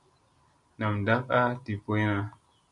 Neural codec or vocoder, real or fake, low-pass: none; real; 10.8 kHz